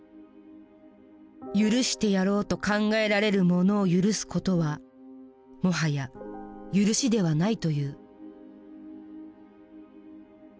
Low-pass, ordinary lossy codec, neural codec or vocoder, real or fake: none; none; none; real